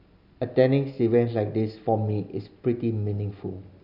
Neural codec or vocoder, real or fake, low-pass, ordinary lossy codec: none; real; 5.4 kHz; none